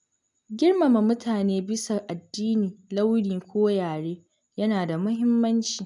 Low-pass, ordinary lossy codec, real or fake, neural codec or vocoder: 10.8 kHz; none; real; none